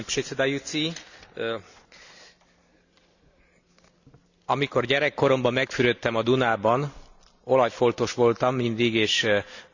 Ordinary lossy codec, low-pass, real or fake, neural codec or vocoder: none; 7.2 kHz; real; none